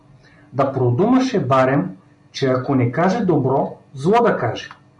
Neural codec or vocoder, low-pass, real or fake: none; 10.8 kHz; real